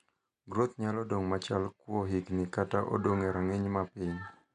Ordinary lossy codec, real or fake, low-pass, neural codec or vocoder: none; real; 10.8 kHz; none